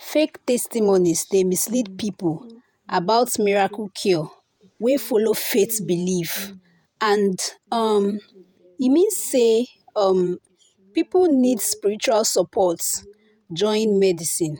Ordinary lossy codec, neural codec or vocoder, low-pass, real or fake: none; vocoder, 48 kHz, 128 mel bands, Vocos; none; fake